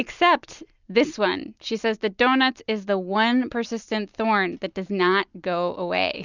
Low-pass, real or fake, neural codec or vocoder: 7.2 kHz; real; none